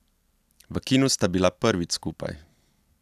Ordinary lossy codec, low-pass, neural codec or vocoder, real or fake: none; 14.4 kHz; none; real